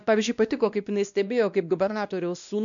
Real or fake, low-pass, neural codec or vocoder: fake; 7.2 kHz; codec, 16 kHz, 1 kbps, X-Codec, WavLM features, trained on Multilingual LibriSpeech